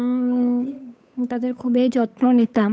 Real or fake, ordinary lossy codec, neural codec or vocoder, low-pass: fake; none; codec, 16 kHz, 8 kbps, FunCodec, trained on Chinese and English, 25 frames a second; none